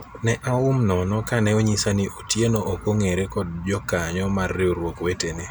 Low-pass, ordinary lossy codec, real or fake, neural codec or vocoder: none; none; real; none